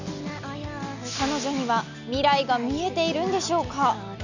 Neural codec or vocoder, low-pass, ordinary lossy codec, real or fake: none; 7.2 kHz; none; real